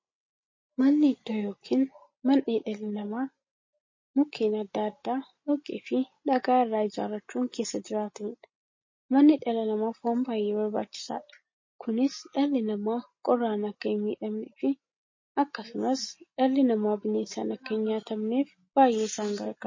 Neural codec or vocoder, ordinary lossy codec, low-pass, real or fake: none; MP3, 32 kbps; 7.2 kHz; real